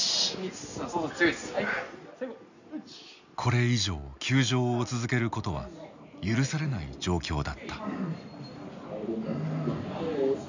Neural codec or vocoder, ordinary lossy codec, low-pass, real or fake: none; none; 7.2 kHz; real